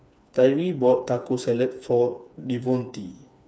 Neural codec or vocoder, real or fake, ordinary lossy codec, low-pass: codec, 16 kHz, 4 kbps, FreqCodec, smaller model; fake; none; none